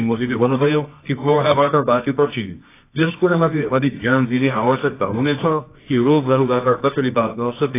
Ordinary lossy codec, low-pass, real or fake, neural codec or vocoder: AAC, 24 kbps; 3.6 kHz; fake; codec, 24 kHz, 0.9 kbps, WavTokenizer, medium music audio release